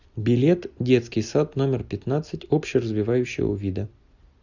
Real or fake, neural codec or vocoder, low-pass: real; none; 7.2 kHz